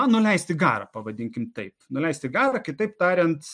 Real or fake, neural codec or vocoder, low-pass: real; none; 9.9 kHz